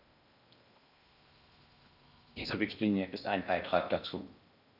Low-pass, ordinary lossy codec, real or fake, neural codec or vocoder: 5.4 kHz; none; fake; codec, 16 kHz in and 24 kHz out, 0.6 kbps, FocalCodec, streaming, 2048 codes